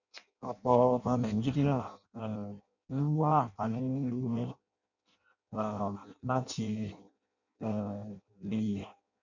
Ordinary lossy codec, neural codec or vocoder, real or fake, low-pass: none; codec, 16 kHz in and 24 kHz out, 0.6 kbps, FireRedTTS-2 codec; fake; 7.2 kHz